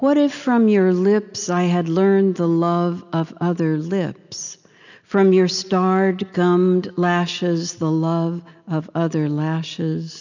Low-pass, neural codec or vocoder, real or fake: 7.2 kHz; none; real